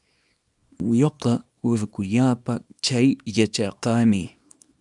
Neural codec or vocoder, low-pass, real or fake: codec, 24 kHz, 0.9 kbps, WavTokenizer, small release; 10.8 kHz; fake